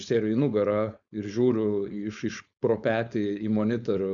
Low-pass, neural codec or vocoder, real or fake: 7.2 kHz; codec, 16 kHz, 4.8 kbps, FACodec; fake